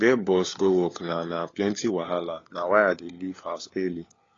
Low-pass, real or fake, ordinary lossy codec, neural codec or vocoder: 7.2 kHz; fake; AAC, 32 kbps; codec, 16 kHz, 4 kbps, FunCodec, trained on LibriTTS, 50 frames a second